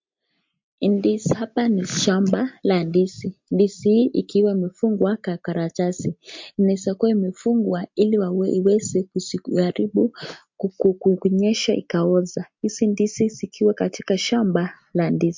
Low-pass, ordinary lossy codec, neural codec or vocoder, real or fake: 7.2 kHz; MP3, 48 kbps; none; real